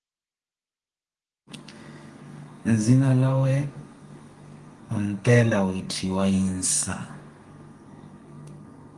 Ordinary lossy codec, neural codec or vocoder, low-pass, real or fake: Opus, 24 kbps; codec, 32 kHz, 1.9 kbps, SNAC; 10.8 kHz; fake